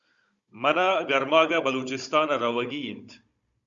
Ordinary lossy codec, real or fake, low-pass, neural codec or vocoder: Opus, 64 kbps; fake; 7.2 kHz; codec, 16 kHz, 16 kbps, FunCodec, trained on Chinese and English, 50 frames a second